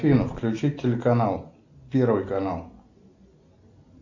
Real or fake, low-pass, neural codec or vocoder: real; 7.2 kHz; none